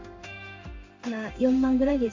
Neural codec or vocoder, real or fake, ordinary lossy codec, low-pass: codec, 16 kHz in and 24 kHz out, 1 kbps, XY-Tokenizer; fake; MP3, 48 kbps; 7.2 kHz